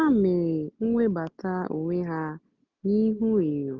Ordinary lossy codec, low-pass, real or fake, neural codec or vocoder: none; 7.2 kHz; real; none